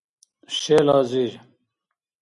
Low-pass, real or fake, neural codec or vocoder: 10.8 kHz; real; none